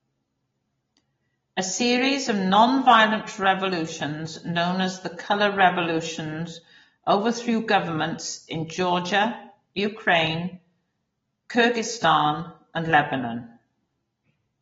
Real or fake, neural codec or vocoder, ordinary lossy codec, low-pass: real; none; AAC, 24 kbps; 7.2 kHz